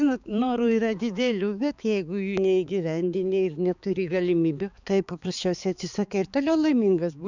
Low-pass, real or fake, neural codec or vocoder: 7.2 kHz; fake; codec, 16 kHz, 4 kbps, X-Codec, HuBERT features, trained on balanced general audio